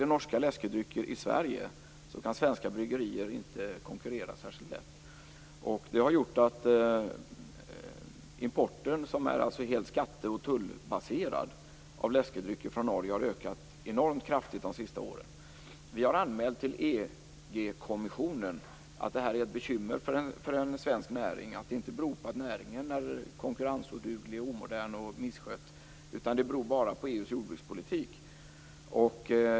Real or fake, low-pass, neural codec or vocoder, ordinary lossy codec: real; none; none; none